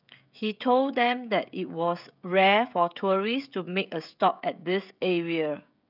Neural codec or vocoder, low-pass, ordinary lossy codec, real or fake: codec, 16 kHz, 16 kbps, FreqCodec, smaller model; 5.4 kHz; none; fake